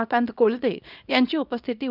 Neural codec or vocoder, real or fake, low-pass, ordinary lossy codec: codec, 24 kHz, 0.9 kbps, WavTokenizer, small release; fake; 5.4 kHz; none